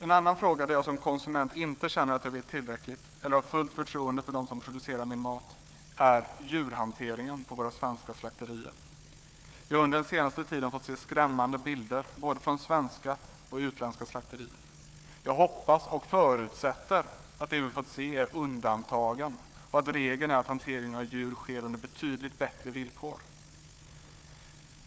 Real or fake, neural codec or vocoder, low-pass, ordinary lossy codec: fake; codec, 16 kHz, 4 kbps, FunCodec, trained on Chinese and English, 50 frames a second; none; none